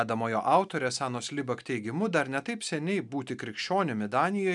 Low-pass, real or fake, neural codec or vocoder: 10.8 kHz; real; none